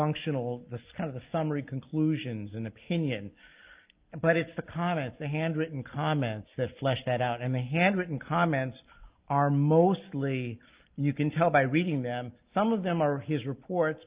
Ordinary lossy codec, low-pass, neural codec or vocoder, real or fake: Opus, 32 kbps; 3.6 kHz; none; real